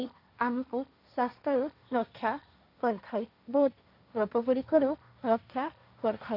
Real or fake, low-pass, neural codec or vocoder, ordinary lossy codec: fake; 5.4 kHz; codec, 16 kHz, 1.1 kbps, Voila-Tokenizer; none